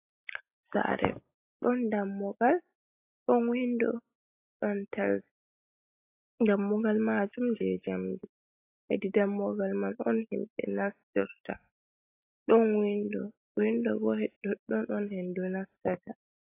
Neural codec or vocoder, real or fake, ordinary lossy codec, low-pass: none; real; AAC, 24 kbps; 3.6 kHz